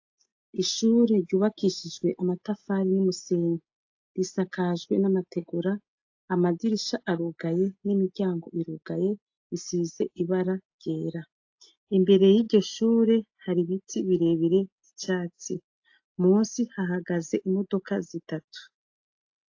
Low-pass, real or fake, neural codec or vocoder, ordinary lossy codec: 7.2 kHz; real; none; AAC, 48 kbps